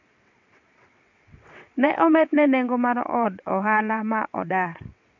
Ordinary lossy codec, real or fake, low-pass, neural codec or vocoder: MP3, 48 kbps; fake; 7.2 kHz; vocoder, 22.05 kHz, 80 mel bands, WaveNeXt